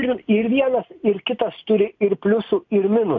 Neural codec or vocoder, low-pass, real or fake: none; 7.2 kHz; real